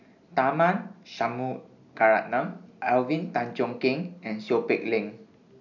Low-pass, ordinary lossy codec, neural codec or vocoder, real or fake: 7.2 kHz; none; none; real